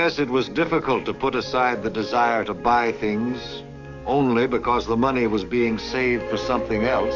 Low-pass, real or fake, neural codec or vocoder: 7.2 kHz; fake; codec, 44.1 kHz, 7.8 kbps, DAC